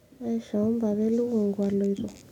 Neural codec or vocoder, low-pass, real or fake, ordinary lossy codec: none; 19.8 kHz; real; none